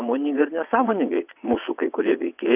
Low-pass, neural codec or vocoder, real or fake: 3.6 kHz; vocoder, 22.05 kHz, 80 mel bands, WaveNeXt; fake